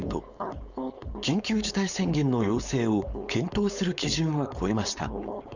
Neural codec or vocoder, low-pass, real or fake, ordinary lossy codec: codec, 16 kHz, 4.8 kbps, FACodec; 7.2 kHz; fake; none